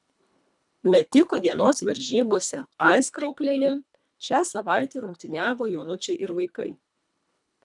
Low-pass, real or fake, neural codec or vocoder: 10.8 kHz; fake; codec, 24 kHz, 1.5 kbps, HILCodec